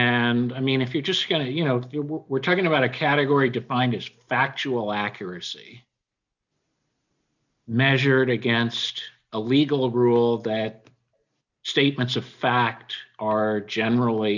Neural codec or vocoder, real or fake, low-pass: none; real; 7.2 kHz